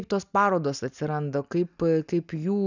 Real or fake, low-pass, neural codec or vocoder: real; 7.2 kHz; none